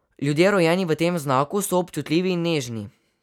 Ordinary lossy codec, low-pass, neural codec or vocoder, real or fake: none; 19.8 kHz; none; real